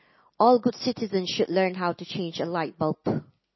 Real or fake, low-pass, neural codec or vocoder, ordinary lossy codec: real; 7.2 kHz; none; MP3, 24 kbps